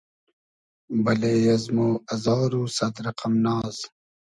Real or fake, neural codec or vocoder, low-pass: real; none; 10.8 kHz